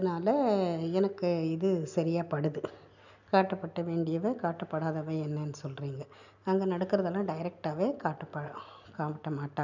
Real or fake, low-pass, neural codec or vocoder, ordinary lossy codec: real; 7.2 kHz; none; none